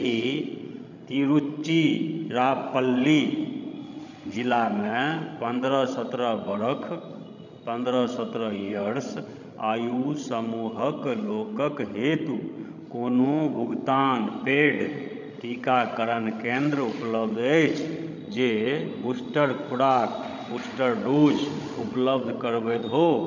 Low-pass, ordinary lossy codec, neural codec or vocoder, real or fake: 7.2 kHz; none; codec, 16 kHz, 16 kbps, FreqCodec, larger model; fake